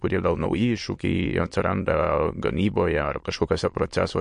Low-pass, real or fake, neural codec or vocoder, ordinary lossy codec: 9.9 kHz; fake; autoencoder, 22.05 kHz, a latent of 192 numbers a frame, VITS, trained on many speakers; MP3, 48 kbps